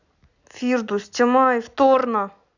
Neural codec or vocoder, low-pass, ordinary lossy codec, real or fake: none; 7.2 kHz; none; real